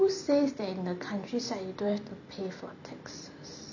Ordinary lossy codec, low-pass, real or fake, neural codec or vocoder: none; 7.2 kHz; fake; vocoder, 44.1 kHz, 128 mel bands every 256 samples, BigVGAN v2